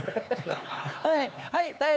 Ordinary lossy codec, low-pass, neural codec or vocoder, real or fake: none; none; codec, 16 kHz, 4 kbps, X-Codec, HuBERT features, trained on LibriSpeech; fake